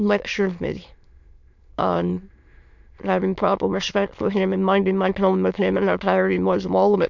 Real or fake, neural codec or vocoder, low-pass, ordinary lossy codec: fake; autoencoder, 22.05 kHz, a latent of 192 numbers a frame, VITS, trained on many speakers; 7.2 kHz; MP3, 64 kbps